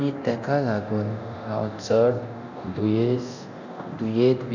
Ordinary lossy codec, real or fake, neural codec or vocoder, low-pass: none; fake; codec, 24 kHz, 0.9 kbps, DualCodec; 7.2 kHz